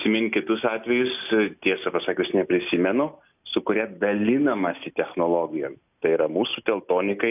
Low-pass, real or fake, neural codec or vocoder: 3.6 kHz; real; none